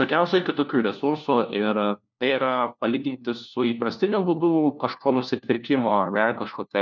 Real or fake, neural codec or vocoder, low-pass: fake; codec, 16 kHz, 1 kbps, FunCodec, trained on LibriTTS, 50 frames a second; 7.2 kHz